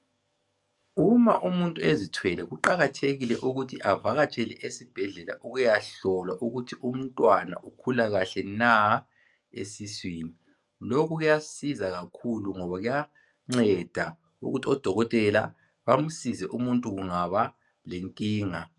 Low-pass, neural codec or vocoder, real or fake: 10.8 kHz; codec, 44.1 kHz, 7.8 kbps, DAC; fake